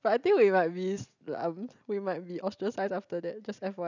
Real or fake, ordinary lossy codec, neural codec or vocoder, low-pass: real; none; none; 7.2 kHz